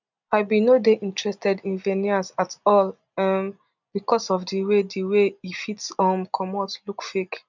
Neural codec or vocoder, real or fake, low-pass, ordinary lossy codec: none; real; 7.2 kHz; none